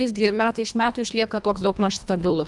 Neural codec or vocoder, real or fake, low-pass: codec, 24 kHz, 1.5 kbps, HILCodec; fake; 10.8 kHz